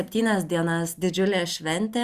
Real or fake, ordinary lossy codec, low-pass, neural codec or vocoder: real; Opus, 64 kbps; 14.4 kHz; none